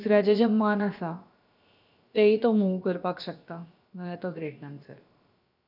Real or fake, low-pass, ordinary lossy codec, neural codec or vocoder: fake; 5.4 kHz; none; codec, 16 kHz, about 1 kbps, DyCAST, with the encoder's durations